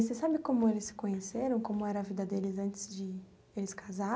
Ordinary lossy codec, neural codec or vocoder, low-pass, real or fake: none; none; none; real